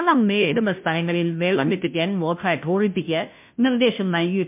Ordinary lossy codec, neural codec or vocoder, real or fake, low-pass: MP3, 32 kbps; codec, 16 kHz, 0.5 kbps, FunCodec, trained on Chinese and English, 25 frames a second; fake; 3.6 kHz